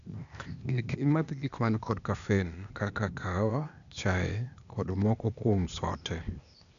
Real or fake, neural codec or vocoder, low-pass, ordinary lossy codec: fake; codec, 16 kHz, 0.8 kbps, ZipCodec; 7.2 kHz; none